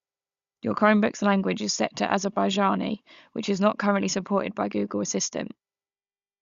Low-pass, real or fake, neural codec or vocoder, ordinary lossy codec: 7.2 kHz; fake; codec, 16 kHz, 16 kbps, FunCodec, trained on Chinese and English, 50 frames a second; Opus, 64 kbps